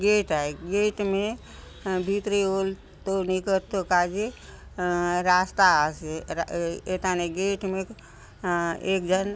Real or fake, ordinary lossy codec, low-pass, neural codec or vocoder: real; none; none; none